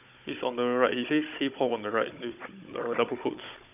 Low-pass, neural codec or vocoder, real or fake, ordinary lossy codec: 3.6 kHz; codec, 16 kHz, 16 kbps, FunCodec, trained on Chinese and English, 50 frames a second; fake; none